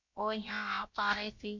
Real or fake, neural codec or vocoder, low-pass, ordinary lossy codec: fake; codec, 16 kHz, about 1 kbps, DyCAST, with the encoder's durations; 7.2 kHz; MP3, 48 kbps